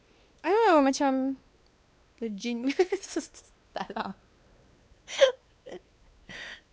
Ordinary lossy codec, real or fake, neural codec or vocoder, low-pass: none; fake; codec, 16 kHz, 2 kbps, X-Codec, WavLM features, trained on Multilingual LibriSpeech; none